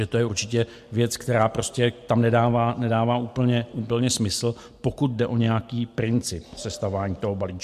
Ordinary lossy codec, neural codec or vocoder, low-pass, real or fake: MP3, 64 kbps; none; 14.4 kHz; real